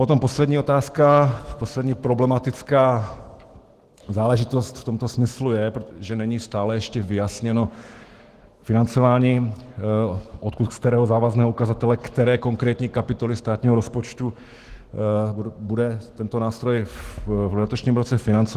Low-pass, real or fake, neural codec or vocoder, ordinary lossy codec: 14.4 kHz; fake; autoencoder, 48 kHz, 128 numbers a frame, DAC-VAE, trained on Japanese speech; Opus, 16 kbps